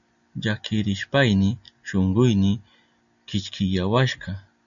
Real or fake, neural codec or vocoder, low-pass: real; none; 7.2 kHz